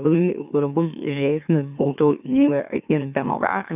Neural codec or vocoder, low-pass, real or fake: autoencoder, 44.1 kHz, a latent of 192 numbers a frame, MeloTTS; 3.6 kHz; fake